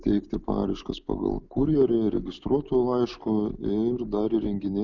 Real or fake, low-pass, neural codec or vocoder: real; 7.2 kHz; none